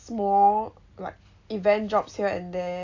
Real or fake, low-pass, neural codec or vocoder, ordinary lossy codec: real; 7.2 kHz; none; AAC, 48 kbps